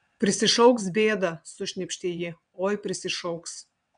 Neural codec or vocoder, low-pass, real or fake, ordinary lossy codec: vocoder, 22.05 kHz, 80 mel bands, WaveNeXt; 9.9 kHz; fake; MP3, 96 kbps